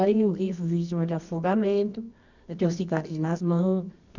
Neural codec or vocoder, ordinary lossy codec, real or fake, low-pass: codec, 24 kHz, 0.9 kbps, WavTokenizer, medium music audio release; none; fake; 7.2 kHz